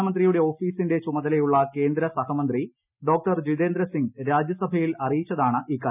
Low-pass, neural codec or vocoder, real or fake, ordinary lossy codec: 3.6 kHz; none; real; none